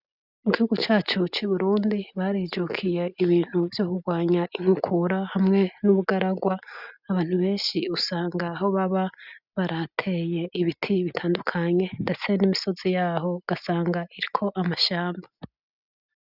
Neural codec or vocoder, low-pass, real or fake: none; 5.4 kHz; real